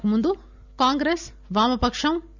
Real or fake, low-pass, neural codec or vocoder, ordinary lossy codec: real; 7.2 kHz; none; none